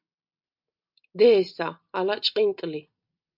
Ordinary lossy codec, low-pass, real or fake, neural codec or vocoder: MP3, 48 kbps; 5.4 kHz; real; none